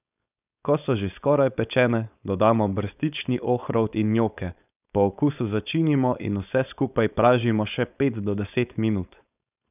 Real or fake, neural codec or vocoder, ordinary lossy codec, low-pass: fake; codec, 16 kHz, 4.8 kbps, FACodec; none; 3.6 kHz